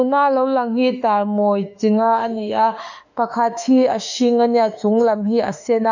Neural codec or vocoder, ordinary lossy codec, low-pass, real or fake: autoencoder, 48 kHz, 32 numbers a frame, DAC-VAE, trained on Japanese speech; none; 7.2 kHz; fake